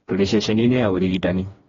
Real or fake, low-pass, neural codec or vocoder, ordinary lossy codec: fake; 7.2 kHz; codec, 16 kHz, 2 kbps, FreqCodec, smaller model; AAC, 24 kbps